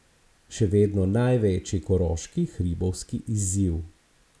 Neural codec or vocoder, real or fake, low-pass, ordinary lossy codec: none; real; none; none